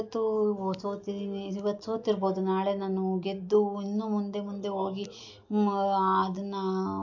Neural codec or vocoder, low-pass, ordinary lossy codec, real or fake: none; 7.2 kHz; AAC, 48 kbps; real